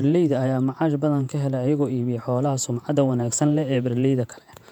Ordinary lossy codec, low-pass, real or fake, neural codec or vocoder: MP3, 96 kbps; 19.8 kHz; fake; vocoder, 48 kHz, 128 mel bands, Vocos